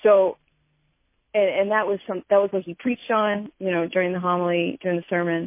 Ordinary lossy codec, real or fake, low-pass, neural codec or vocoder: MP3, 24 kbps; real; 3.6 kHz; none